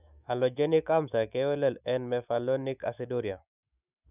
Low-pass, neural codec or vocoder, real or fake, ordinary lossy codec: 3.6 kHz; autoencoder, 48 kHz, 128 numbers a frame, DAC-VAE, trained on Japanese speech; fake; none